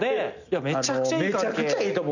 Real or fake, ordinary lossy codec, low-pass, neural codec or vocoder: real; none; 7.2 kHz; none